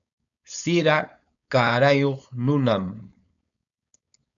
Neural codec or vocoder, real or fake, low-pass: codec, 16 kHz, 4.8 kbps, FACodec; fake; 7.2 kHz